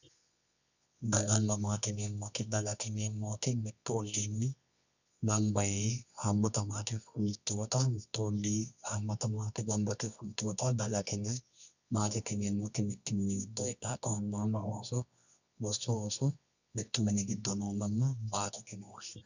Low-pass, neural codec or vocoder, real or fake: 7.2 kHz; codec, 24 kHz, 0.9 kbps, WavTokenizer, medium music audio release; fake